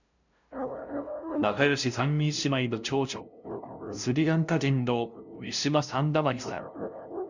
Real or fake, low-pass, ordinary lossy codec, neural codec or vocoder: fake; 7.2 kHz; none; codec, 16 kHz, 0.5 kbps, FunCodec, trained on LibriTTS, 25 frames a second